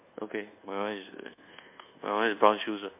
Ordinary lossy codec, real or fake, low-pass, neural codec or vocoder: MP3, 32 kbps; fake; 3.6 kHz; codec, 24 kHz, 1.2 kbps, DualCodec